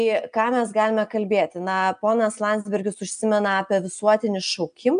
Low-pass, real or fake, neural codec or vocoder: 9.9 kHz; real; none